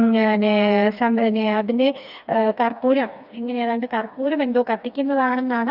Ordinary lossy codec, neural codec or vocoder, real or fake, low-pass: Opus, 64 kbps; codec, 16 kHz, 2 kbps, FreqCodec, smaller model; fake; 5.4 kHz